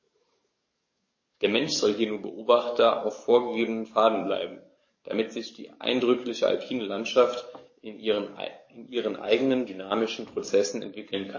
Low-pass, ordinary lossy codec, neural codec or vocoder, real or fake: 7.2 kHz; MP3, 32 kbps; codec, 44.1 kHz, 7.8 kbps, DAC; fake